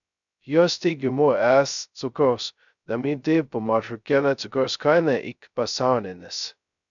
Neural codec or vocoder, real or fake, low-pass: codec, 16 kHz, 0.2 kbps, FocalCodec; fake; 7.2 kHz